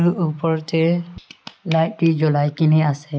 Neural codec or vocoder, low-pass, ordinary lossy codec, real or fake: none; none; none; real